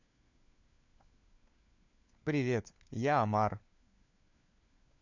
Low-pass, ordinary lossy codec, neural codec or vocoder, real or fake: 7.2 kHz; none; codec, 16 kHz, 4 kbps, FunCodec, trained on LibriTTS, 50 frames a second; fake